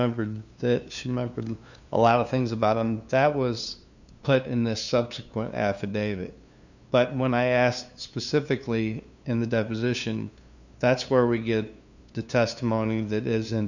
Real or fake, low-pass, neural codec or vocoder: fake; 7.2 kHz; codec, 16 kHz, 2 kbps, FunCodec, trained on LibriTTS, 25 frames a second